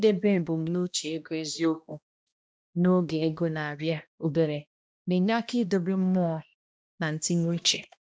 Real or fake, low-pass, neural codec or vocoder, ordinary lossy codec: fake; none; codec, 16 kHz, 1 kbps, X-Codec, HuBERT features, trained on balanced general audio; none